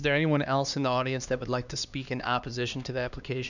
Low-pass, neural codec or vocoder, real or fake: 7.2 kHz; codec, 16 kHz, 2 kbps, X-Codec, HuBERT features, trained on LibriSpeech; fake